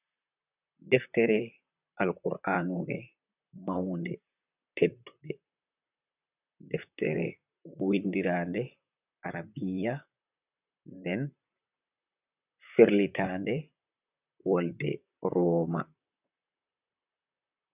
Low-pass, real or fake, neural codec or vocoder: 3.6 kHz; fake; vocoder, 44.1 kHz, 128 mel bands, Pupu-Vocoder